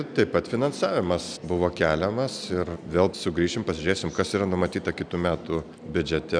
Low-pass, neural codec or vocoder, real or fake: 9.9 kHz; none; real